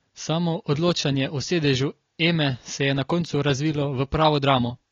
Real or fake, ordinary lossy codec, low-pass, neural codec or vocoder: real; AAC, 32 kbps; 7.2 kHz; none